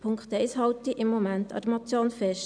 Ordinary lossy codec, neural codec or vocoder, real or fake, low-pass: none; none; real; 9.9 kHz